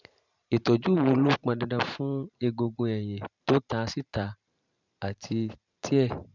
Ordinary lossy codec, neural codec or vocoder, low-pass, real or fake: none; vocoder, 44.1 kHz, 128 mel bands every 512 samples, BigVGAN v2; 7.2 kHz; fake